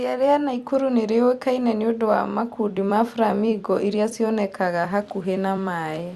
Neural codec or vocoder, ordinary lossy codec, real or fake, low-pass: vocoder, 44.1 kHz, 128 mel bands every 256 samples, BigVGAN v2; Opus, 64 kbps; fake; 14.4 kHz